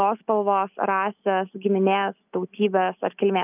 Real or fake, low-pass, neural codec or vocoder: real; 3.6 kHz; none